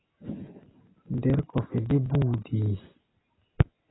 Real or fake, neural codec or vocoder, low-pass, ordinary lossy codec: real; none; 7.2 kHz; AAC, 16 kbps